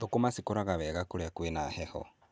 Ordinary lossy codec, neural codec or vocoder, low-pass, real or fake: none; none; none; real